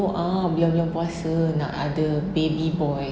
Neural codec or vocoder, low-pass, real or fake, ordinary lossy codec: none; none; real; none